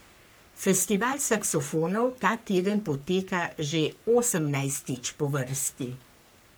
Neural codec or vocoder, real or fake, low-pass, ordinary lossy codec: codec, 44.1 kHz, 3.4 kbps, Pupu-Codec; fake; none; none